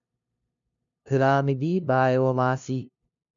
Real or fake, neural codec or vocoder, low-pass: fake; codec, 16 kHz, 0.5 kbps, FunCodec, trained on LibriTTS, 25 frames a second; 7.2 kHz